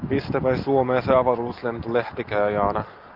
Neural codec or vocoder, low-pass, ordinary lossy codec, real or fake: none; 5.4 kHz; Opus, 24 kbps; real